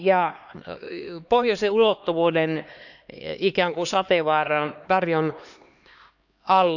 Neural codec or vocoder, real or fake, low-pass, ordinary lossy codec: codec, 16 kHz, 2 kbps, X-Codec, HuBERT features, trained on LibriSpeech; fake; 7.2 kHz; none